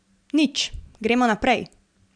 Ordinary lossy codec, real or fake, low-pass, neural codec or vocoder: none; real; 9.9 kHz; none